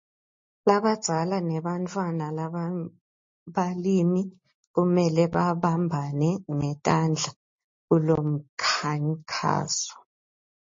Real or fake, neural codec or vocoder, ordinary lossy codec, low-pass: real; none; MP3, 32 kbps; 7.2 kHz